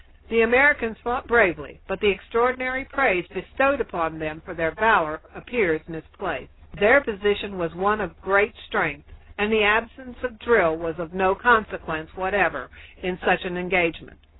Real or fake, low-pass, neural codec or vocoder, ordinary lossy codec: real; 7.2 kHz; none; AAC, 16 kbps